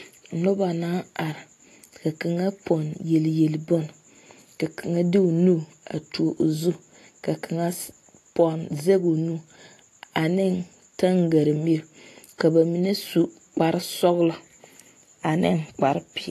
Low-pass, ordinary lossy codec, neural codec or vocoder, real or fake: 14.4 kHz; AAC, 48 kbps; none; real